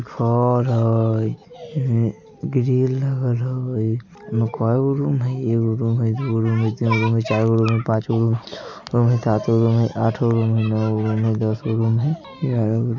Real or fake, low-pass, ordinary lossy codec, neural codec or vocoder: real; 7.2 kHz; MP3, 64 kbps; none